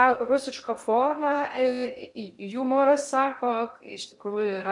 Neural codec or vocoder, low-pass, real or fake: codec, 16 kHz in and 24 kHz out, 0.6 kbps, FocalCodec, streaming, 2048 codes; 10.8 kHz; fake